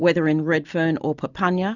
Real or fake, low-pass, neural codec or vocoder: real; 7.2 kHz; none